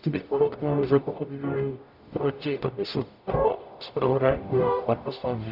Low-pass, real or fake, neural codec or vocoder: 5.4 kHz; fake; codec, 44.1 kHz, 0.9 kbps, DAC